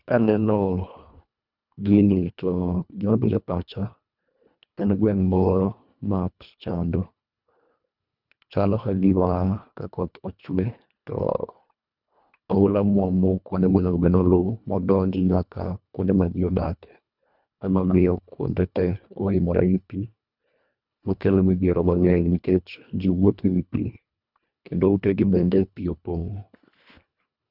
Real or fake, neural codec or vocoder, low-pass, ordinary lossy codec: fake; codec, 24 kHz, 1.5 kbps, HILCodec; 5.4 kHz; none